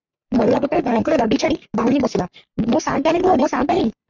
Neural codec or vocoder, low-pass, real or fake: codec, 44.1 kHz, 3.4 kbps, Pupu-Codec; 7.2 kHz; fake